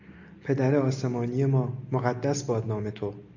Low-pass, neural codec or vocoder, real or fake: 7.2 kHz; none; real